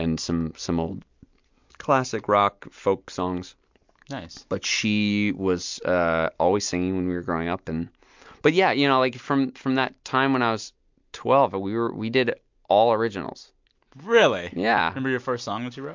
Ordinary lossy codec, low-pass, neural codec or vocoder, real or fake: MP3, 64 kbps; 7.2 kHz; none; real